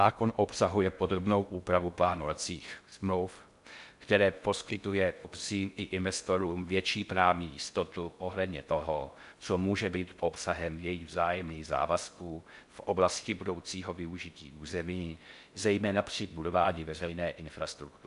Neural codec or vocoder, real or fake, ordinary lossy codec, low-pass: codec, 16 kHz in and 24 kHz out, 0.6 kbps, FocalCodec, streaming, 2048 codes; fake; MP3, 96 kbps; 10.8 kHz